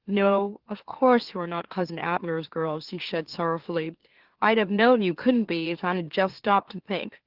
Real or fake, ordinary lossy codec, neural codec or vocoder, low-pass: fake; Opus, 16 kbps; autoencoder, 44.1 kHz, a latent of 192 numbers a frame, MeloTTS; 5.4 kHz